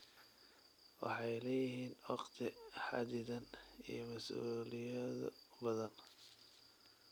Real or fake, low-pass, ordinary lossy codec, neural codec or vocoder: real; none; none; none